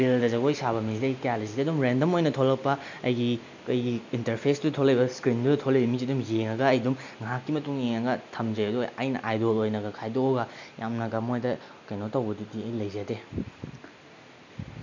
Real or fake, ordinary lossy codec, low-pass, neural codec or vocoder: real; MP3, 64 kbps; 7.2 kHz; none